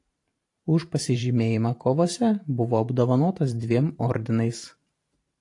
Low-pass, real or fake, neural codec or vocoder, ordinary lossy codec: 10.8 kHz; fake; vocoder, 44.1 kHz, 128 mel bands every 512 samples, BigVGAN v2; AAC, 48 kbps